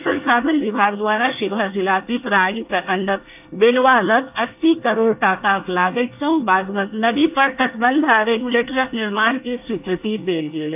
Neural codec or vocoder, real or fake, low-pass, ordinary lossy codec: codec, 24 kHz, 1 kbps, SNAC; fake; 3.6 kHz; AAC, 32 kbps